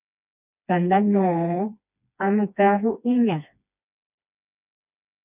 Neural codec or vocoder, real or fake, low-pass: codec, 16 kHz, 2 kbps, FreqCodec, smaller model; fake; 3.6 kHz